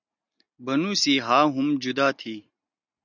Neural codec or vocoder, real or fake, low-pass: none; real; 7.2 kHz